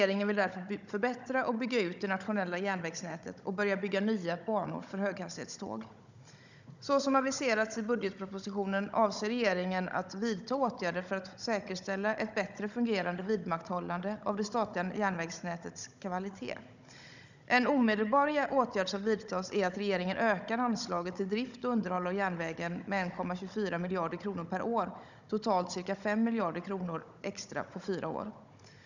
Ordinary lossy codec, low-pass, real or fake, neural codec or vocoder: none; 7.2 kHz; fake; codec, 16 kHz, 16 kbps, FunCodec, trained on Chinese and English, 50 frames a second